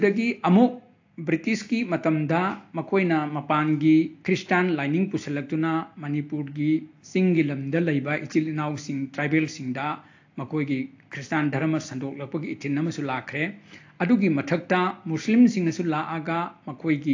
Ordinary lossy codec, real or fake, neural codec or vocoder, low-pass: AAC, 48 kbps; real; none; 7.2 kHz